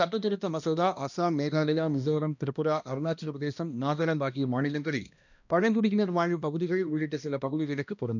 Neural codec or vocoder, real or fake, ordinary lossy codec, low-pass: codec, 16 kHz, 1 kbps, X-Codec, HuBERT features, trained on balanced general audio; fake; none; 7.2 kHz